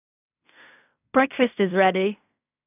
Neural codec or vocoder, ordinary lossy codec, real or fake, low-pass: codec, 16 kHz in and 24 kHz out, 0.4 kbps, LongCat-Audio-Codec, fine tuned four codebook decoder; none; fake; 3.6 kHz